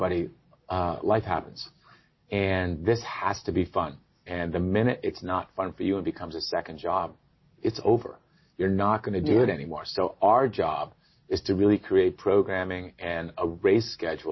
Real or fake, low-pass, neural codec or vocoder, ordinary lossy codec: real; 7.2 kHz; none; MP3, 24 kbps